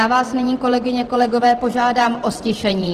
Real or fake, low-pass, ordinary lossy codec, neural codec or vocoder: fake; 14.4 kHz; Opus, 16 kbps; vocoder, 44.1 kHz, 128 mel bands every 512 samples, BigVGAN v2